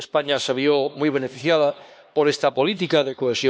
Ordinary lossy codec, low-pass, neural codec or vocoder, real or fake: none; none; codec, 16 kHz, 2 kbps, X-Codec, HuBERT features, trained on LibriSpeech; fake